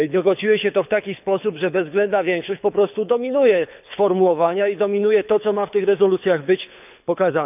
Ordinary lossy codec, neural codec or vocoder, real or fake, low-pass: none; codec, 24 kHz, 6 kbps, HILCodec; fake; 3.6 kHz